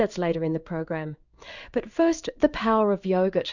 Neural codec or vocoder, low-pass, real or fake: codec, 16 kHz in and 24 kHz out, 1 kbps, XY-Tokenizer; 7.2 kHz; fake